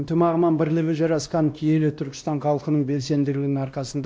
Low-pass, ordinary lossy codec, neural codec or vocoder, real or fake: none; none; codec, 16 kHz, 1 kbps, X-Codec, WavLM features, trained on Multilingual LibriSpeech; fake